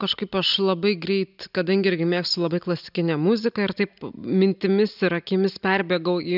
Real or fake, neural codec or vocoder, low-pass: real; none; 5.4 kHz